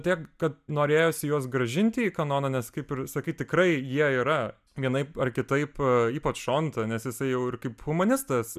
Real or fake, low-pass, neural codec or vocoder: real; 14.4 kHz; none